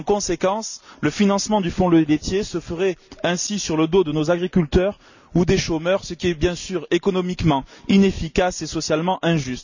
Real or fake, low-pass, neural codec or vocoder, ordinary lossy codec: real; 7.2 kHz; none; none